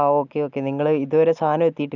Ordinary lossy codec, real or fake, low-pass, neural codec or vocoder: none; real; 7.2 kHz; none